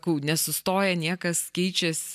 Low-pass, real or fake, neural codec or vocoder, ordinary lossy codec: 14.4 kHz; real; none; MP3, 96 kbps